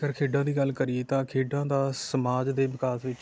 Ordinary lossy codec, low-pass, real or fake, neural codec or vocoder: none; none; real; none